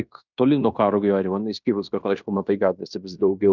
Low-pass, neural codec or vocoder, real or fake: 7.2 kHz; codec, 16 kHz in and 24 kHz out, 0.9 kbps, LongCat-Audio-Codec, fine tuned four codebook decoder; fake